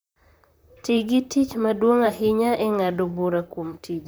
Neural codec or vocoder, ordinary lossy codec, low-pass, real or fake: vocoder, 44.1 kHz, 128 mel bands, Pupu-Vocoder; none; none; fake